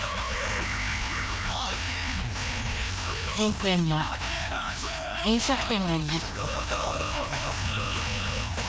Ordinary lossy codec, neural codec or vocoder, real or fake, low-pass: none; codec, 16 kHz, 1 kbps, FreqCodec, larger model; fake; none